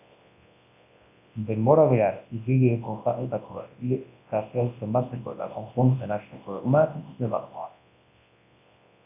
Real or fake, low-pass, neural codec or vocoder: fake; 3.6 kHz; codec, 24 kHz, 0.9 kbps, WavTokenizer, large speech release